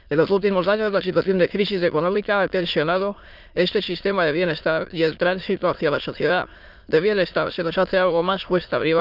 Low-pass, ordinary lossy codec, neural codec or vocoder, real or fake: 5.4 kHz; none; autoencoder, 22.05 kHz, a latent of 192 numbers a frame, VITS, trained on many speakers; fake